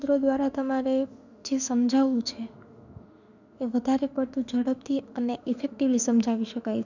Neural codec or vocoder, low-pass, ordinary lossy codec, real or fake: autoencoder, 48 kHz, 32 numbers a frame, DAC-VAE, trained on Japanese speech; 7.2 kHz; none; fake